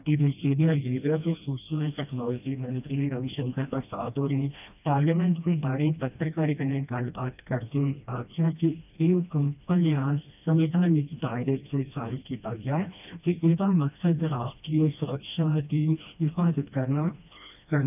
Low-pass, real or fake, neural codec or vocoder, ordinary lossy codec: 3.6 kHz; fake; codec, 16 kHz, 1 kbps, FreqCodec, smaller model; none